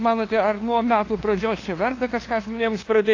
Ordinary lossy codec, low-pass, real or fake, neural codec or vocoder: AAC, 32 kbps; 7.2 kHz; fake; codec, 16 kHz, 2 kbps, FunCodec, trained on LibriTTS, 25 frames a second